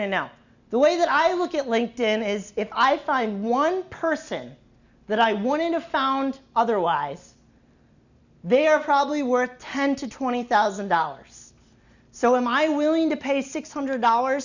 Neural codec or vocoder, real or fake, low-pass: none; real; 7.2 kHz